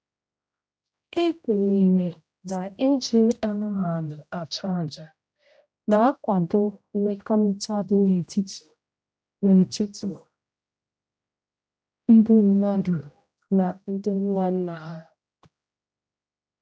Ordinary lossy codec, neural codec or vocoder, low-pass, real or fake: none; codec, 16 kHz, 0.5 kbps, X-Codec, HuBERT features, trained on general audio; none; fake